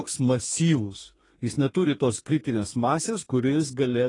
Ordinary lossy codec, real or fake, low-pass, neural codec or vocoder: AAC, 32 kbps; fake; 10.8 kHz; codec, 32 kHz, 1.9 kbps, SNAC